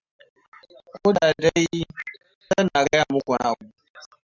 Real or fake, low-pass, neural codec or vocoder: real; 7.2 kHz; none